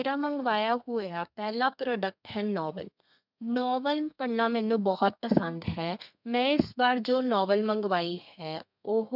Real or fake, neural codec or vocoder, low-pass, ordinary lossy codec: fake; codec, 32 kHz, 1.9 kbps, SNAC; 5.4 kHz; none